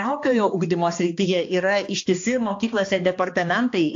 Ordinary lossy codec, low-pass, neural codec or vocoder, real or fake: AAC, 48 kbps; 7.2 kHz; codec, 16 kHz, 2 kbps, X-Codec, HuBERT features, trained on balanced general audio; fake